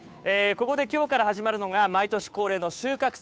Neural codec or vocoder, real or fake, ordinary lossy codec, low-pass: codec, 16 kHz, 2 kbps, FunCodec, trained on Chinese and English, 25 frames a second; fake; none; none